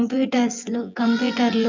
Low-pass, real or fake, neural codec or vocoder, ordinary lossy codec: 7.2 kHz; fake; vocoder, 24 kHz, 100 mel bands, Vocos; MP3, 64 kbps